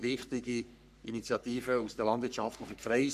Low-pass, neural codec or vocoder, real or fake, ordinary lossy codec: 14.4 kHz; codec, 44.1 kHz, 3.4 kbps, Pupu-Codec; fake; none